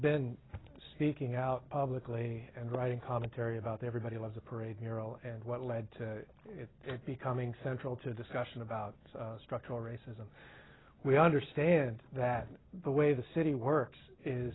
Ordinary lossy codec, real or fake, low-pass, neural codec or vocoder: AAC, 16 kbps; real; 7.2 kHz; none